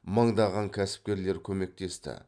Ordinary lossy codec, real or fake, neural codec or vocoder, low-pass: none; real; none; 9.9 kHz